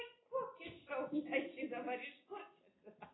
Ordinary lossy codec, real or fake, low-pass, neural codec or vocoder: AAC, 16 kbps; real; 7.2 kHz; none